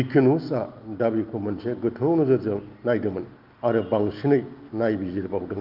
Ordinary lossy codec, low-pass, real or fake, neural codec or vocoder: Opus, 24 kbps; 5.4 kHz; real; none